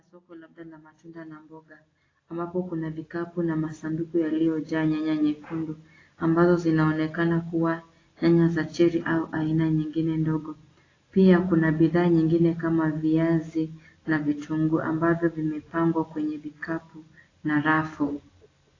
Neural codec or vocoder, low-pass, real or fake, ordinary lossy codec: none; 7.2 kHz; real; AAC, 32 kbps